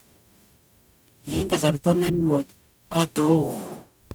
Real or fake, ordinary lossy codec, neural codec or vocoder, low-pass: fake; none; codec, 44.1 kHz, 0.9 kbps, DAC; none